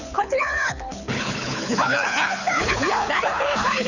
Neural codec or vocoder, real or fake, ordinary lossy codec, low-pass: codec, 24 kHz, 6 kbps, HILCodec; fake; none; 7.2 kHz